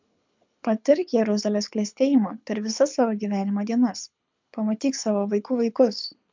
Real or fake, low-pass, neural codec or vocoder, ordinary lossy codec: fake; 7.2 kHz; codec, 24 kHz, 6 kbps, HILCodec; MP3, 64 kbps